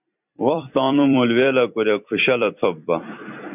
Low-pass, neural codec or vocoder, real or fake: 3.6 kHz; vocoder, 44.1 kHz, 128 mel bands every 256 samples, BigVGAN v2; fake